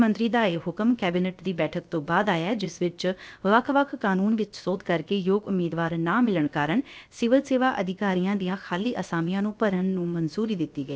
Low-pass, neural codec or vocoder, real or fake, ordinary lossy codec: none; codec, 16 kHz, about 1 kbps, DyCAST, with the encoder's durations; fake; none